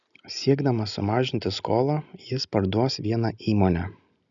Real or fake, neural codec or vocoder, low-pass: real; none; 7.2 kHz